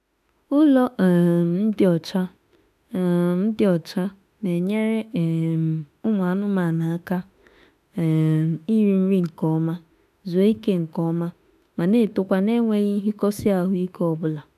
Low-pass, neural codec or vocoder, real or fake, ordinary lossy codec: 14.4 kHz; autoencoder, 48 kHz, 32 numbers a frame, DAC-VAE, trained on Japanese speech; fake; none